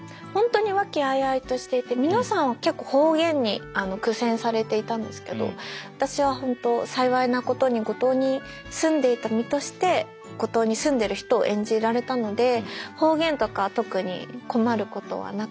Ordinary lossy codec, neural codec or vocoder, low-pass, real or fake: none; none; none; real